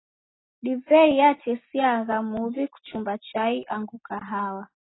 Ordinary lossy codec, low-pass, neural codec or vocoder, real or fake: AAC, 16 kbps; 7.2 kHz; none; real